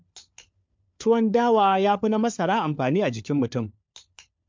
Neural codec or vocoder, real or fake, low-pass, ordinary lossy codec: codec, 16 kHz, 4 kbps, FunCodec, trained on LibriTTS, 50 frames a second; fake; 7.2 kHz; MP3, 64 kbps